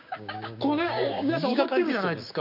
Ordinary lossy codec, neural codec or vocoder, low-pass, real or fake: none; codec, 16 kHz, 6 kbps, DAC; 5.4 kHz; fake